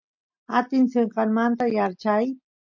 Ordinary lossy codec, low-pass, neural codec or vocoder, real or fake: MP3, 48 kbps; 7.2 kHz; none; real